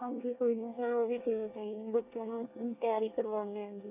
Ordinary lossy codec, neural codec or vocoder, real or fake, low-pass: none; codec, 24 kHz, 1 kbps, SNAC; fake; 3.6 kHz